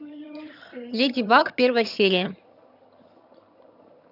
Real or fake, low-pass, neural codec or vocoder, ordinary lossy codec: fake; 5.4 kHz; vocoder, 22.05 kHz, 80 mel bands, HiFi-GAN; none